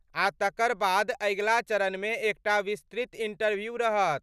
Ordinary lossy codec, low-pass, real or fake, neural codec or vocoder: none; 14.4 kHz; real; none